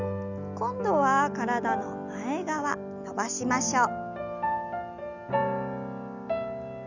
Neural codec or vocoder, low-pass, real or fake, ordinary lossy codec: none; 7.2 kHz; real; none